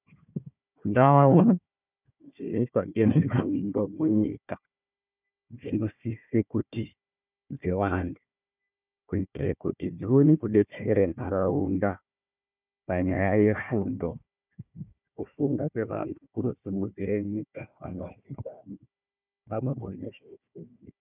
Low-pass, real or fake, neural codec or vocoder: 3.6 kHz; fake; codec, 16 kHz, 1 kbps, FunCodec, trained on Chinese and English, 50 frames a second